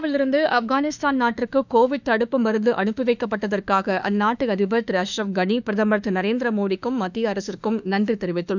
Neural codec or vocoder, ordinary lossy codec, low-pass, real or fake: autoencoder, 48 kHz, 32 numbers a frame, DAC-VAE, trained on Japanese speech; none; 7.2 kHz; fake